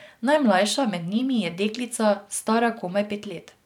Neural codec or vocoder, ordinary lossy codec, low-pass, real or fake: vocoder, 44.1 kHz, 128 mel bands every 512 samples, BigVGAN v2; none; 19.8 kHz; fake